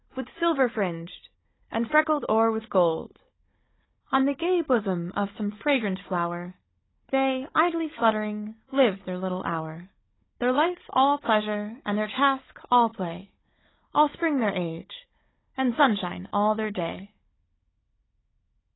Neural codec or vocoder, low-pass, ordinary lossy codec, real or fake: codec, 16 kHz, 16 kbps, FunCodec, trained on Chinese and English, 50 frames a second; 7.2 kHz; AAC, 16 kbps; fake